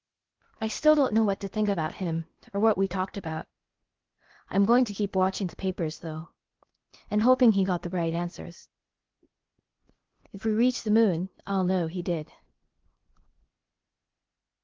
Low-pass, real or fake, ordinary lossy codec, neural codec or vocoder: 7.2 kHz; fake; Opus, 16 kbps; codec, 16 kHz, 0.8 kbps, ZipCodec